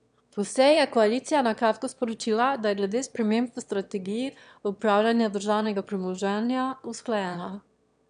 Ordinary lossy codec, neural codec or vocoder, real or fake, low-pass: none; autoencoder, 22.05 kHz, a latent of 192 numbers a frame, VITS, trained on one speaker; fake; 9.9 kHz